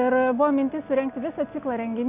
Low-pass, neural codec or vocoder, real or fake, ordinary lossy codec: 3.6 kHz; none; real; AAC, 32 kbps